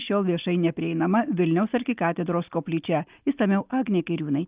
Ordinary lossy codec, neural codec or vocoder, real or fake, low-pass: Opus, 32 kbps; none; real; 3.6 kHz